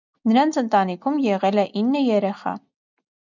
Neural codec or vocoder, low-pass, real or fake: none; 7.2 kHz; real